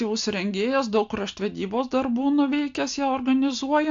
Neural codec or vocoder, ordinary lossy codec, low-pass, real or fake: none; AAC, 64 kbps; 7.2 kHz; real